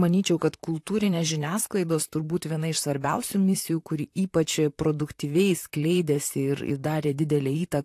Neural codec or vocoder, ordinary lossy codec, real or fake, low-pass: vocoder, 44.1 kHz, 128 mel bands, Pupu-Vocoder; AAC, 64 kbps; fake; 14.4 kHz